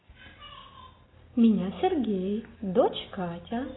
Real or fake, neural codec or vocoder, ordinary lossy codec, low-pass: real; none; AAC, 16 kbps; 7.2 kHz